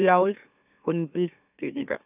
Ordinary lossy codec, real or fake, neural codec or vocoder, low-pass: none; fake; autoencoder, 44.1 kHz, a latent of 192 numbers a frame, MeloTTS; 3.6 kHz